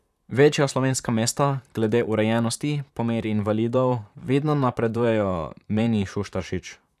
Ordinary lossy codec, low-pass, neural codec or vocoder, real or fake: none; 14.4 kHz; vocoder, 44.1 kHz, 128 mel bands, Pupu-Vocoder; fake